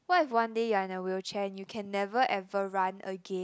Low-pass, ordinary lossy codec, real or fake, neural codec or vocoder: none; none; real; none